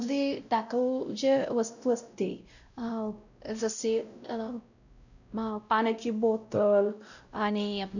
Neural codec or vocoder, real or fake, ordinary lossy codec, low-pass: codec, 16 kHz, 0.5 kbps, X-Codec, WavLM features, trained on Multilingual LibriSpeech; fake; none; 7.2 kHz